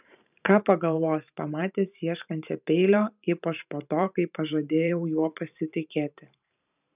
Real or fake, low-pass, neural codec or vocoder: fake; 3.6 kHz; vocoder, 44.1 kHz, 128 mel bands every 512 samples, BigVGAN v2